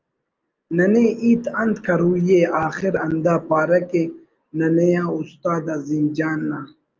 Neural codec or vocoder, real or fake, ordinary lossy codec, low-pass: none; real; Opus, 24 kbps; 7.2 kHz